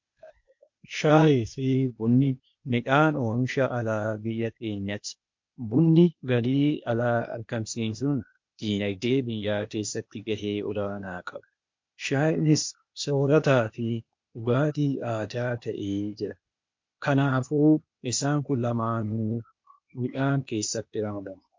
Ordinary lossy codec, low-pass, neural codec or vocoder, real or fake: MP3, 48 kbps; 7.2 kHz; codec, 16 kHz, 0.8 kbps, ZipCodec; fake